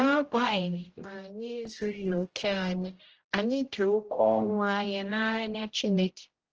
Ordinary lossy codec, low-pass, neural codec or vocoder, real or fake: Opus, 16 kbps; 7.2 kHz; codec, 16 kHz, 0.5 kbps, X-Codec, HuBERT features, trained on general audio; fake